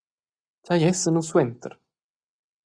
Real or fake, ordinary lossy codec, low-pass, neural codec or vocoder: real; Opus, 64 kbps; 9.9 kHz; none